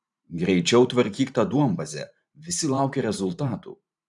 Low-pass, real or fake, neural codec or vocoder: 10.8 kHz; fake; vocoder, 44.1 kHz, 128 mel bands every 512 samples, BigVGAN v2